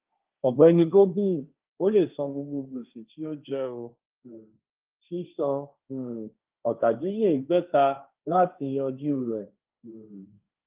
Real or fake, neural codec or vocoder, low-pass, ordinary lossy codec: fake; codec, 16 kHz, 1.1 kbps, Voila-Tokenizer; 3.6 kHz; Opus, 24 kbps